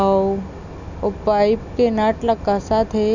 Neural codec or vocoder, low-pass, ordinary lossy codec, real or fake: none; 7.2 kHz; none; real